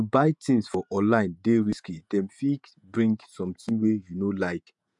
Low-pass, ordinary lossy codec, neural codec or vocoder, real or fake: 10.8 kHz; MP3, 96 kbps; vocoder, 48 kHz, 128 mel bands, Vocos; fake